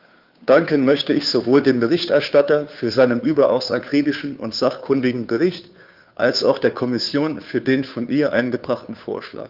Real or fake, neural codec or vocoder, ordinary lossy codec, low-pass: fake; codec, 16 kHz, 2 kbps, FunCodec, trained on Chinese and English, 25 frames a second; Opus, 32 kbps; 5.4 kHz